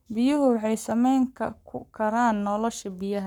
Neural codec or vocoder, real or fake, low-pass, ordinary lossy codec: codec, 44.1 kHz, 7.8 kbps, Pupu-Codec; fake; 19.8 kHz; none